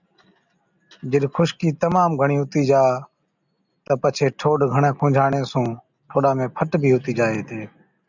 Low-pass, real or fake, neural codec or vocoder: 7.2 kHz; real; none